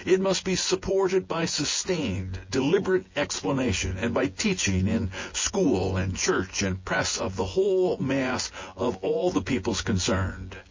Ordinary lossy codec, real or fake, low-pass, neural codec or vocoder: MP3, 32 kbps; fake; 7.2 kHz; vocoder, 24 kHz, 100 mel bands, Vocos